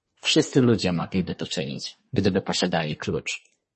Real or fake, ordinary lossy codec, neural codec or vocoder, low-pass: fake; MP3, 32 kbps; codec, 24 kHz, 1 kbps, SNAC; 10.8 kHz